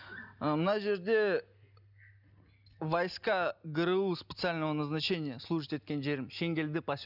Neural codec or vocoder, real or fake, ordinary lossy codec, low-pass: none; real; none; 5.4 kHz